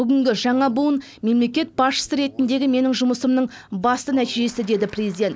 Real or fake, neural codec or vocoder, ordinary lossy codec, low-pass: real; none; none; none